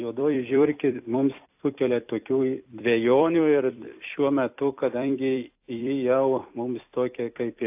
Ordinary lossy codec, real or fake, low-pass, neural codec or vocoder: AAC, 32 kbps; fake; 3.6 kHz; vocoder, 44.1 kHz, 128 mel bands every 256 samples, BigVGAN v2